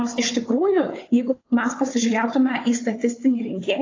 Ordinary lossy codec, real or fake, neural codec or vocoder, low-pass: AAC, 32 kbps; fake; codec, 16 kHz, 4 kbps, FunCodec, trained on Chinese and English, 50 frames a second; 7.2 kHz